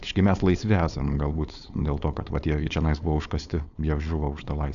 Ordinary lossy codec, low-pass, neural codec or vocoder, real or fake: MP3, 96 kbps; 7.2 kHz; codec, 16 kHz, 4.8 kbps, FACodec; fake